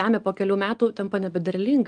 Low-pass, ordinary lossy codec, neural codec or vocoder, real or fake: 9.9 kHz; Opus, 32 kbps; none; real